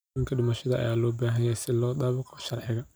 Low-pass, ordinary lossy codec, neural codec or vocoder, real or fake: none; none; none; real